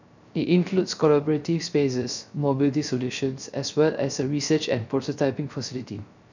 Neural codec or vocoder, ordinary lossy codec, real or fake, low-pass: codec, 16 kHz, 0.3 kbps, FocalCodec; none; fake; 7.2 kHz